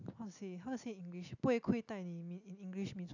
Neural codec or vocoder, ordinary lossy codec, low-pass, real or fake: none; none; 7.2 kHz; real